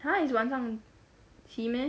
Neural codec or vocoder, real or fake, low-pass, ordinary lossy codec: none; real; none; none